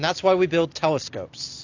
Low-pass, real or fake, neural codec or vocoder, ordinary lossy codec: 7.2 kHz; real; none; AAC, 48 kbps